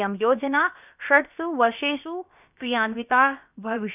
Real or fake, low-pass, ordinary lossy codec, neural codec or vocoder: fake; 3.6 kHz; none; codec, 16 kHz, about 1 kbps, DyCAST, with the encoder's durations